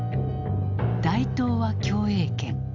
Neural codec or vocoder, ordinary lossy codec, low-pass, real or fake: none; AAC, 48 kbps; 7.2 kHz; real